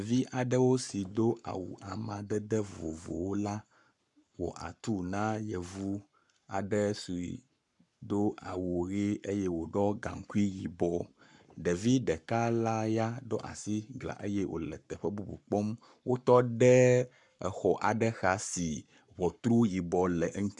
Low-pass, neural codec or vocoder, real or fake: 10.8 kHz; codec, 44.1 kHz, 7.8 kbps, Pupu-Codec; fake